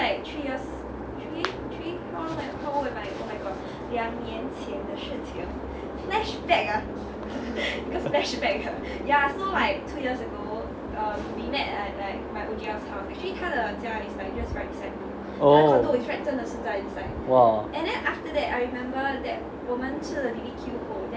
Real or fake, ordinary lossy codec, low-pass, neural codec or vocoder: real; none; none; none